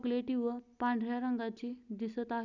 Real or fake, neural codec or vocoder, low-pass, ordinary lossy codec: real; none; 7.2 kHz; none